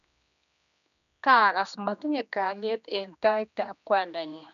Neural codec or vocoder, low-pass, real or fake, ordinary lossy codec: codec, 16 kHz, 2 kbps, X-Codec, HuBERT features, trained on general audio; 7.2 kHz; fake; none